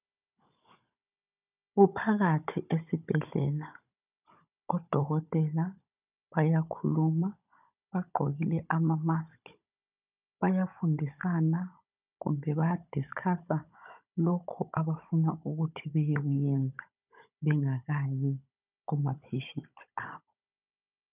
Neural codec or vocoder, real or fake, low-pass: codec, 16 kHz, 16 kbps, FunCodec, trained on Chinese and English, 50 frames a second; fake; 3.6 kHz